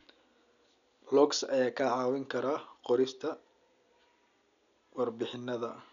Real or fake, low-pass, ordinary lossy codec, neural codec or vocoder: real; 7.2 kHz; none; none